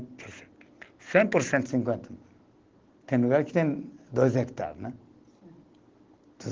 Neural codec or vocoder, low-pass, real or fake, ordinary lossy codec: none; 7.2 kHz; real; Opus, 16 kbps